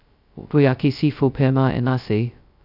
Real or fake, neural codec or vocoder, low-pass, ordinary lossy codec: fake; codec, 16 kHz, 0.2 kbps, FocalCodec; 5.4 kHz; none